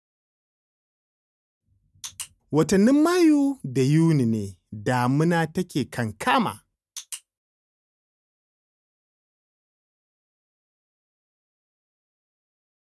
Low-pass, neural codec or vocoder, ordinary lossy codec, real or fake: none; none; none; real